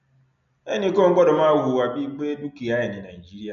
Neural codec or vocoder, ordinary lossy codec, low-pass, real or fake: none; none; 7.2 kHz; real